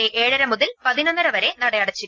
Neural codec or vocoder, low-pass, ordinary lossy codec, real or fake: none; 7.2 kHz; Opus, 24 kbps; real